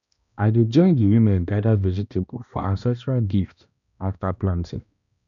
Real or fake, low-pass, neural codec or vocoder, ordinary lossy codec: fake; 7.2 kHz; codec, 16 kHz, 1 kbps, X-Codec, HuBERT features, trained on balanced general audio; none